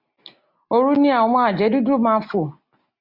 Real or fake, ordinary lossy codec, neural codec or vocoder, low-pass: real; Opus, 64 kbps; none; 5.4 kHz